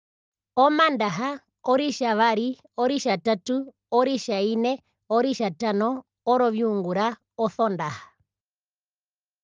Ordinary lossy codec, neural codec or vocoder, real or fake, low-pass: Opus, 32 kbps; none; real; 7.2 kHz